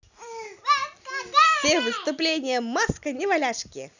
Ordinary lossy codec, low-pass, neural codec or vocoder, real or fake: none; 7.2 kHz; none; real